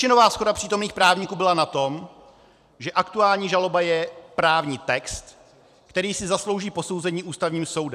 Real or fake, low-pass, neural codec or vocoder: fake; 14.4 kHz; vocoder, 44.1 kHz, 128 mel bands every 256 samples, BigVGAN v2